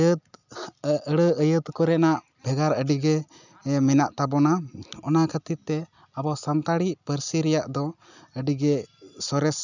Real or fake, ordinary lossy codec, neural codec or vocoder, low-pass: real; none; none; 7.2 kHz